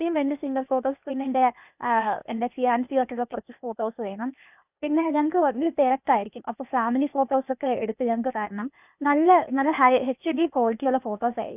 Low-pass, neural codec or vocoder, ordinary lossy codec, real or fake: 3.6 kHz; codec, 16 kHz, 0.8 kbps, ZipCodec; none; fake